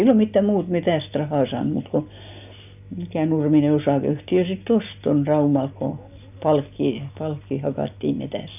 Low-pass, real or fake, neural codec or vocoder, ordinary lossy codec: 3.6 kHz; real; none; none